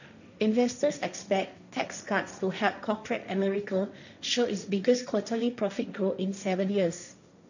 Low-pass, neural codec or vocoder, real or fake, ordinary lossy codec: 7.2 kHz; codec, 16 kHz, 1.1 kbps, Voila-Tokenizer; fake; none